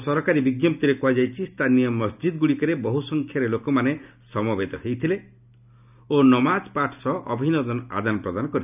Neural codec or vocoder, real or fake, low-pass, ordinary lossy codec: none; real; 3.6 kHz; none